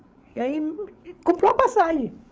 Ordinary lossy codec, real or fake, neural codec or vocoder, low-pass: none; fake; codec, 16 kHz, 16 kbps, FreqCodec, larger model; none